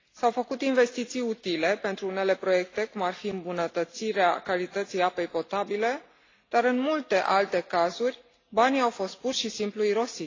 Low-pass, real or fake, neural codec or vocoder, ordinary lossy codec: 7.2 kHz; real; none; AAC, 32 kbps